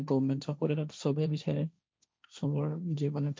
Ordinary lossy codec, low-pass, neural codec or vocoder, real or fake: none; none; codec, 16 kHz, 1.1 kbps, Voila-Tokenizer; fake